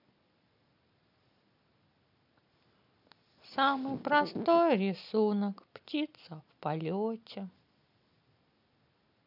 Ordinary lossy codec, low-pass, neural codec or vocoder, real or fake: none; 5.4 kHz; none; real